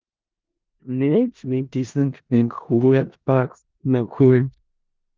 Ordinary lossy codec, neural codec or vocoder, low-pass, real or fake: Opus, 32 kbps; codec, 16 kHz in and 24 kHz out, 0.4 kbps, LongCat-Audio-Codec, four codebook decoder; 7.2 kHz; fake